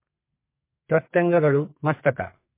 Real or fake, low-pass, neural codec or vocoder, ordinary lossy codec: fake; 3.6 kHz; codec, 32 kHz, 1.9 kbps, SNAC; MP3, 24 kbps